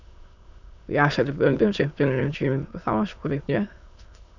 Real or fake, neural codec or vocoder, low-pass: fake; autoencoder, 22.05 kHz, a latent of 192 numbers a frame, VITS, trained on many speakers; 7.2 kHz